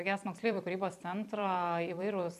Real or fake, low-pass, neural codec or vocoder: fake; 14.4 kHz; vocoder, 44.1 kHz, 128 mel bands every 256 samples, BigVGAN v2